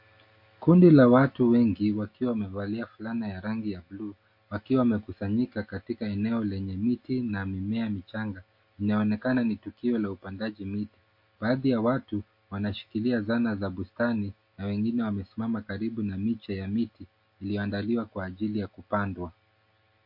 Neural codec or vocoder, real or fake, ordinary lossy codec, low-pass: none; real; MP3, 32 kbps; 5.4 kHz